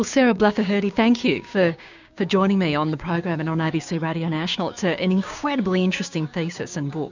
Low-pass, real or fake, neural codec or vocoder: 7.2 kHz; fake; codec, 16 kHz, 6 kbps, DAC